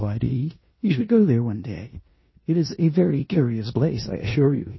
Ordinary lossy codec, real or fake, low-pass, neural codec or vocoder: MP3, 24 kbps; fake; 7.2 kHz; codec, 16 kHz in and 24 kHz out, 0.9 kbps, LongCat-Audio-Codec, four codebook decoder